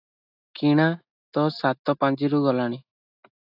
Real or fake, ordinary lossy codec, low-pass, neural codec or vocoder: real; AAC, 48 kbps; 5.4 kHz; none